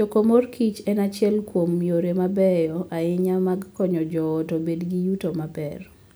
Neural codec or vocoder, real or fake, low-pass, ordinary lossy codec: none; real; none; none